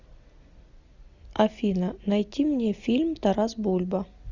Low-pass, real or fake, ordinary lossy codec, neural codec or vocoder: 7.2 kHz; real; Opus, 64 kbps; none